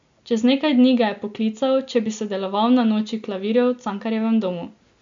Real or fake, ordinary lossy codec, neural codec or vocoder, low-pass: real; MP3, 64 kbps; none; 7.2 kHz